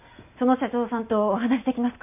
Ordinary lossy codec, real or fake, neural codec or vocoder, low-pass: none; real; none; 3.6 kHz